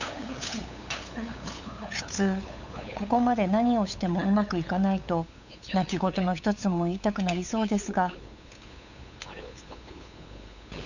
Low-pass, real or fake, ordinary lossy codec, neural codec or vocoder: 7.2 kHz; fake; none; codec, 16 kHz, 8 kbps, FunCodec, trained on LibriTTS, 25 frames a second